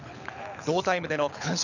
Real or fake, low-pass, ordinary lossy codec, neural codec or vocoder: fake; 7.2 kHz; none; codec, 24 kHz, 6 kbps, HILCodec